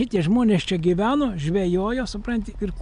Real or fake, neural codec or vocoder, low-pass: real; none; 9.9 kHz